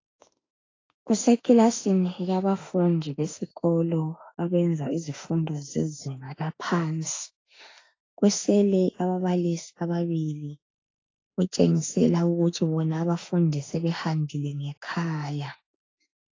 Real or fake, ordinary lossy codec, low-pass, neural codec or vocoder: fake; AAC, 32 kbps; 7.2 kHz; autoencoder, 48 kHz, 32 numbers a frame, DAC-VAE, trained on Japanese speech